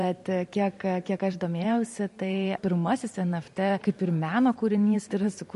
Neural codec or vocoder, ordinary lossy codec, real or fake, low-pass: vocoder, 44.1 kHz, 128 mel bands every 512 samples, BigVGAN v2; MP3, 48 kbps; fake; 14.4 kHz